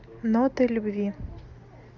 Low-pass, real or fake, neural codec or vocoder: 7.2 kHz; real; none